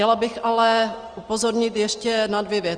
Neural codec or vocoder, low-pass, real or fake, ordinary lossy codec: none; 9.9 kHz; real; Opus, 24 kbps